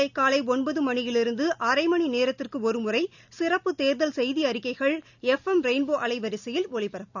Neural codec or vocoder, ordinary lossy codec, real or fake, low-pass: none; none; real; 7.2 kHz